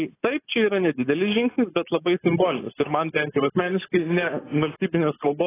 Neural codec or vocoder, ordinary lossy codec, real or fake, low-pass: none; AAC, 16 kbps; real; 3.6 kHz